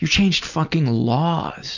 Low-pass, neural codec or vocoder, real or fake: 7.2 kHz; none; real